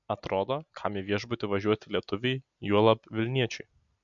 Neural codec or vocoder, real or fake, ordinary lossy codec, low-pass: none; real; MP3, 64 kbps; 7.2 kHz